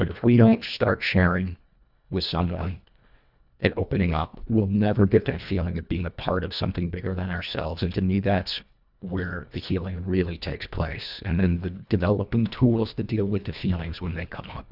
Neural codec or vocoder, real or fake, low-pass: codec, 24 kHz, 1.5 kbps, HILCodec; fake; 5.4 kHz